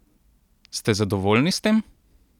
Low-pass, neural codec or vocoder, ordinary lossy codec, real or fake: 19.8 kHz; none; none; real